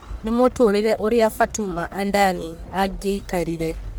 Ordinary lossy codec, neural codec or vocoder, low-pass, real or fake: none; codec, 44.1 kHz, 1.7 kbps, Pupu-Codec; none; fake